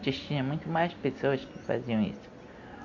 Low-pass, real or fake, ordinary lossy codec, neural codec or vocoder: 7.2 kHz; real; MP3, 48 kbps; none